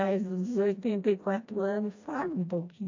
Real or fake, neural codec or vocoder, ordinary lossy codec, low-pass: fake; codec, 16 kHz, 1 kbps, FreqCodec, smaller model; AAC, 48 kbps; 7.2 kHz